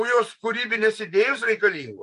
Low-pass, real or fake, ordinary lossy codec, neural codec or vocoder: 10.8 kHz; fake; MP3, 64 kbps; vocoder, 24 kHz, 100 mel bands, Vocos